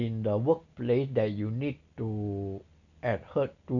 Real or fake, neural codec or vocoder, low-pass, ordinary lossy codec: real; none; 7.2 kHz; none